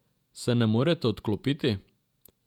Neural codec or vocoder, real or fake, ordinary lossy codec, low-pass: vocoder, 48 kHz, 128 mel bands, Vocos; fake; none; 19.8 kHz